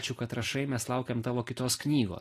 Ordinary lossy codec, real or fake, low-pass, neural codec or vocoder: AAC, 48 kbps; real; 14.4 kHz; none